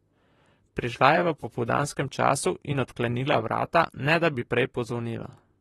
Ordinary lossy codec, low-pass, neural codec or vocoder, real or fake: AAC, 32 kbps; 19.8 kHz; vocoder, 44.1 kHz, 128 mel bands, Pupu-Vocoder; fake